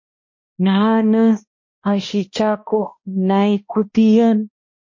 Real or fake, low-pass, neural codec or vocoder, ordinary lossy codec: fake; 7.2 kHz; codec, 16 kHz, 1 kbps, X-Codec, HuBERT features, trained on balanced general audio; MP3, 32 kbps